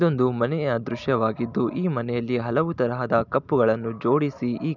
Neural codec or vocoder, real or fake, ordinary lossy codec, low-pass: none; real; none; 7.2 kHz